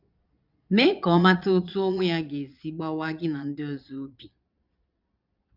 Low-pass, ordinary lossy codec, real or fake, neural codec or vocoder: 5.4 kHz; none; fake; vocoder, 22.05 kHz, 80 mel bands, Vocos